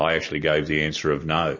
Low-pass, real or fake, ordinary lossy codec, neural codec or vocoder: 7.2 kHz; real; MP3, 32 kbps; none